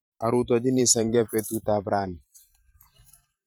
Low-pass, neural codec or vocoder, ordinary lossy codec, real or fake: none; vocoder, 44.1 kHz, 128 mel bands every 512 samples, BigVGAN v2; none; fake